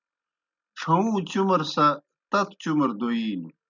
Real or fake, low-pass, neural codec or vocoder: real; 7.2 kHz; none